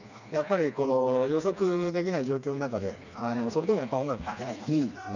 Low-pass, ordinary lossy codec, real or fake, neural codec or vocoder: 7.2 kHz; none; fake; codec, 16 kHz, 2 kbps, FreqCodec, smaller model